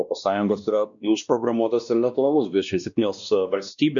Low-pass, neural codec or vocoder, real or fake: 7.2 kHz; codec, 16 kHz, 1 kbps, X-Codec, WavLM features, trained on Multilingual LibriSpeech; fake